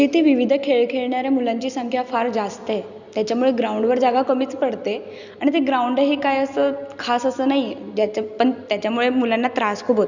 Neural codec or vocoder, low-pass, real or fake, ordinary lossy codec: none; 7.2 kHz; real; none